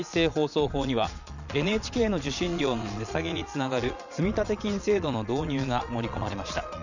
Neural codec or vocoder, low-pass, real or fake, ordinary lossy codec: vocoder, 22.05 kHz, 80 mel bands, Vocos; 7.2 kHz; fake; none